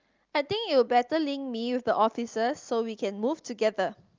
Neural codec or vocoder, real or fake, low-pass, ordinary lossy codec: none; real; 7.2 kHz; Opus, 24 kbps